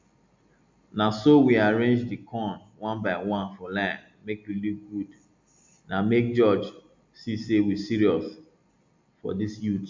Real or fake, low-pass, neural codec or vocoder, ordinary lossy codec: real; 7.2 kHz; none; MP3, 64 kbps